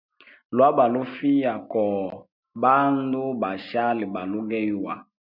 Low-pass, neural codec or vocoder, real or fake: 5.4 kHz; none; real